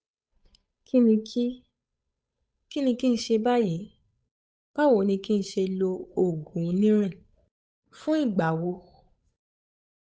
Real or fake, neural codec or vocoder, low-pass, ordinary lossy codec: fake; codec, 16 kHz, 8 kbps, FunCodec, trained on Chinese and English, 25 frames a second; none; none